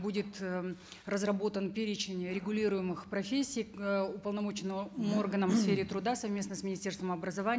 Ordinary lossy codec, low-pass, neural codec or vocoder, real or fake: none; none; none; real